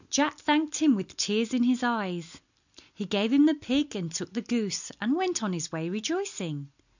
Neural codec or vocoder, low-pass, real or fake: none; 7.2 kHz; real